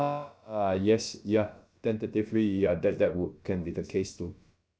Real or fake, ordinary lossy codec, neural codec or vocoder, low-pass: fake; none; codec, 16 kHz, about 1 kbps, DyCAST, with the encoder's durations; none